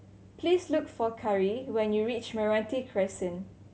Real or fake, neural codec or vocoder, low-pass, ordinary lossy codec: real; none; none; none